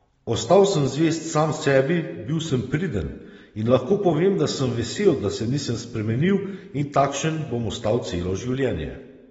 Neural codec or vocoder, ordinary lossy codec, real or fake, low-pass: none; AAC, 24 kbps; real; 19.8 kHz